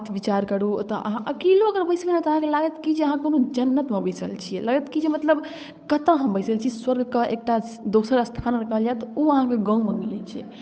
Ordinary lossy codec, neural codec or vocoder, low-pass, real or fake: none; codec, 16 kHz, 8 kbps, FunCodec, trained on Chinese and English, 25 frames a second; none; fake